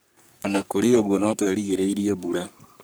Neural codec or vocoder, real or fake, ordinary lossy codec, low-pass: codec, 44.1 kHz, 3.4 kbps, Pupu-Codec; fake; none; none